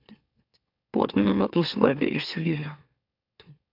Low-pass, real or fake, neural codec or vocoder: 5.4 kHz; fake; autoencoder, 44.1 kHz, a latent of 192 numbers a frame, MeloTTS